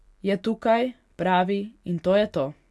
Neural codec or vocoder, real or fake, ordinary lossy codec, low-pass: none; real; none; none